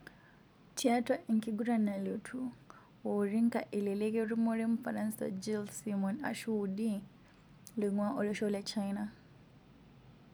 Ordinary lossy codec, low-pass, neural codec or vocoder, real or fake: none; none; none; real